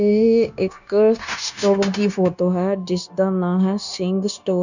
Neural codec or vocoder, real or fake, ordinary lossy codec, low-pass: codec, 16 kHz, 0.9 kbps, LongCat-Audio-Codec; fake; none; 7.2 kHz